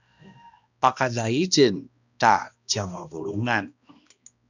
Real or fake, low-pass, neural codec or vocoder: fake; 7.2 kHz; codec, 16 kHz, 1 kbps, X-Codec, HuBERT features, trained on balanced general audio